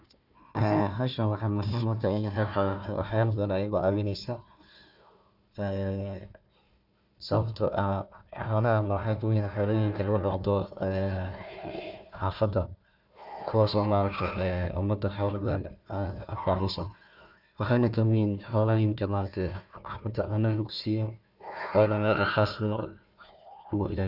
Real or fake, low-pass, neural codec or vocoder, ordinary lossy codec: fake; 5.4 kHz; codec, 16 kHz, 1 kbps, FunCodec, trained on Chinese and English, 50 frames a second; none